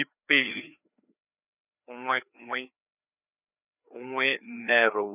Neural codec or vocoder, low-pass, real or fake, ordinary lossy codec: codec, 16 kHz, 2 kbps, FreqCodec, larger model; 3.6 kHz; fake; none